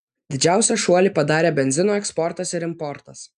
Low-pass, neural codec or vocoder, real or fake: 9.9 kHz; none; real